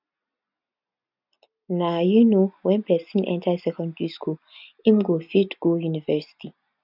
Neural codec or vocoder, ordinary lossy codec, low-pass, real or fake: none; none; 5.4 kHz; real